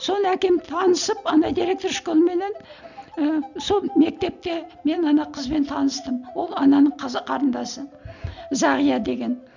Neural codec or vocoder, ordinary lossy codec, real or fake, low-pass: none; none; real; 7.2 kHz